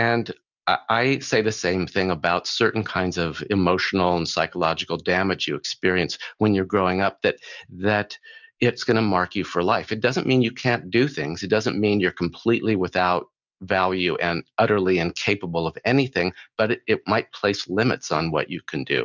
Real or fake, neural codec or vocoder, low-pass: real; none; 7.2 kHz